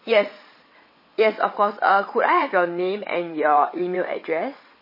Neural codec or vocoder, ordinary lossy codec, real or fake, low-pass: vocoder, 22.05 kHz, 80 mel bands, WaveNeXt; MP3, 24 kbps; fake; 5.4 kHz